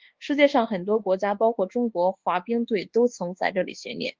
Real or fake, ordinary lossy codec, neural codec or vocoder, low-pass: fake; Opus, 16 kbps; codec, 24 kHz, 1.2 kbps, DualCodec; 7.2 kHz